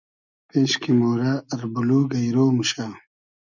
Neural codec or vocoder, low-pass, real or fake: none; 7.2 kHz; real